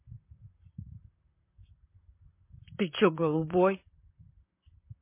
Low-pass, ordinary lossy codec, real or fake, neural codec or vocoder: 3.6 kHz; MP3, 24 kbps; fake; vocoder, 44.1 kHz, 128 mel bands, Pupu-Vocoder